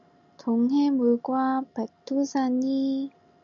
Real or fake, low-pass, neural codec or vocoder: real; 7.2 kHz; none